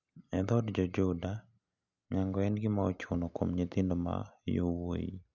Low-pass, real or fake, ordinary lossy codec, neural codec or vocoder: 7.2 kHz; real; none; none